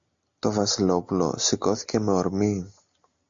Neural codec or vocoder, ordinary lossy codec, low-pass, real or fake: none; AAC, 64 kbps; 7.2 kHz; real